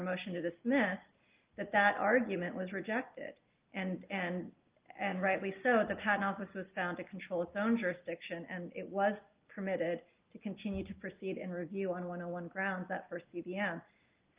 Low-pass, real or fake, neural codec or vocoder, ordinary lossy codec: 3.6 kHz; real; none; Opus, 32 kbps